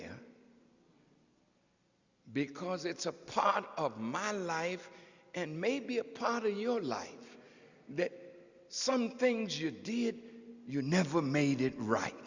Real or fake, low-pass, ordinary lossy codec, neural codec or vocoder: real; 7.2 kHz; Opus, 64 kbps; none